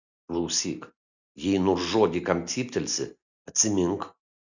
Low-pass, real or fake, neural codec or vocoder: 7.2 kHz; real; none